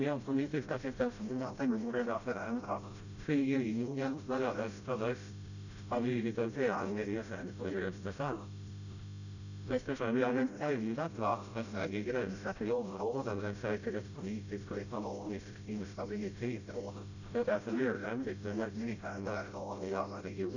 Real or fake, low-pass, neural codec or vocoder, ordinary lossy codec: fake; 7.2 kHz; codec, 16 kHz, 0.5 kbps, FreqCodec, smaller model; none